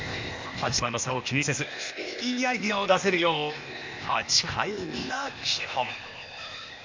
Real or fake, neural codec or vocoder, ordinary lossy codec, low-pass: fake; codec, 16 kHz, 0.8 kbps, ZipCodec; MP3, 64 kbps; 7.2 kHz